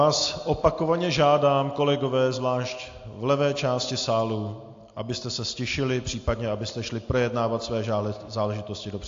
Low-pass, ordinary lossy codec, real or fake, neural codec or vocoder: 7.2 kHz; AAC, 48 kbps; real; none